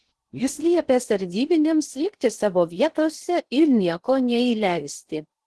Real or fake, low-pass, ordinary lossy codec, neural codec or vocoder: fake; 10.8 kHz; Opus, 16 kbps; codec, 16 kHz in and 24 kHz out, 0.6 kbps, FocalCodec, streaming, 2048 codes